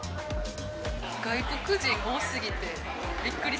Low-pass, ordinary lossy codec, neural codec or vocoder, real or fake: none; none; none; real